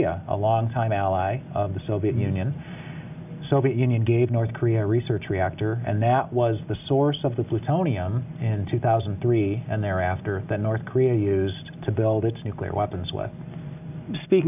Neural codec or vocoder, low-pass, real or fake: none; 3.6 kHz; real